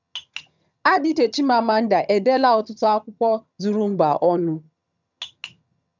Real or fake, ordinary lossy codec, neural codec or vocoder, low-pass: fake; none; vocoder, 22.05 kHz, 80 mel bands, HiFi-GAN; 7.2 kHz